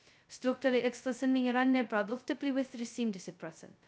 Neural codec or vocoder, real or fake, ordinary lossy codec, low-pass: codec, 16 kHz, 0.2 kbps, FocalCodec; fake; none; none